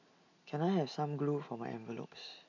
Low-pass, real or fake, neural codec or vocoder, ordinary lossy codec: 7.2 kHz; real; none; none